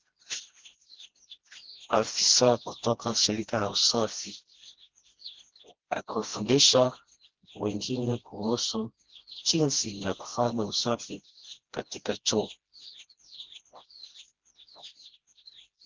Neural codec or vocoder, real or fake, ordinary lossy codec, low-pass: codec, 16 kHz, 1 kbps, FreqCodec, smaller model; fake; Opus, 16 kbps; 7.2 kHz